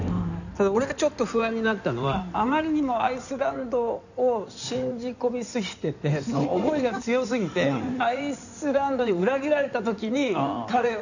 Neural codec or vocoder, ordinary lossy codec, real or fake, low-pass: codec, 16 kHz in and 24 kHz out, 2.2 kbps, FireRedTTS-2 codec; none; fake; 7.2 kHz